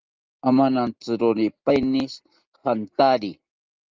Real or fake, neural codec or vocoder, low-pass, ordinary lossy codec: real; none; 7.2 kHz; Opus, 24 kbps